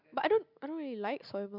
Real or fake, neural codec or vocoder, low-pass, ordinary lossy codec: real; none; 5.4 kHz; MP3, 48 kbps